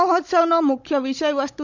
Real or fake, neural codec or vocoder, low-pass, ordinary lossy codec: fake; codec, 16 kHz, 16 kbps, FunCodec, trained on Chinese and English, 50 frames a second; 7.2 kHz; Opus, 64 kbps